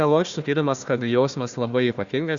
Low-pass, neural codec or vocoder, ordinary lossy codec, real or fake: 7.2 kHz; codec, 16 kHz, 1 kbps, FunCodec, trained on Chinese and English, 50 frames a second; Opus, 64 kbps; fake